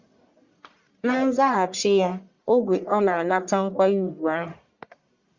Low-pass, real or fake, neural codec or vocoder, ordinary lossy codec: 7.2 kHz; fake; codec, 44.1 kHz, 1.7 kbps, Pupu-Codec; Opus, 64 kbps